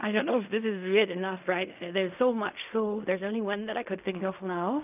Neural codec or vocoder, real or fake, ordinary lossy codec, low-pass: codec, 16 kHz in and 24 kHz out, 0.4 kbps, LongCat-Audio-Codec, fine tuned four codebook decoder; fake; none; 3.6 kHz